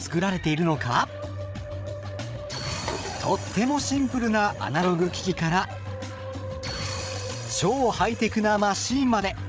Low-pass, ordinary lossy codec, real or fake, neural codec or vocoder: none; none; fake; codec, 16 kHz, 8 kbps, FreqCodec, larger model